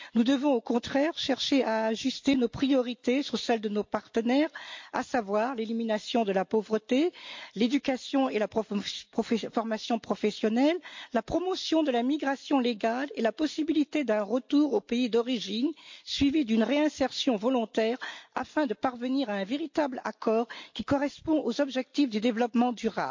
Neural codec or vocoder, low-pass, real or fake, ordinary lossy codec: none; 7.2 kHz; real; MP3, 48 kbps